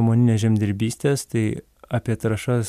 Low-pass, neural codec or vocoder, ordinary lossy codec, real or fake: 14.4 kHz; none; MP3, 96 kbps; real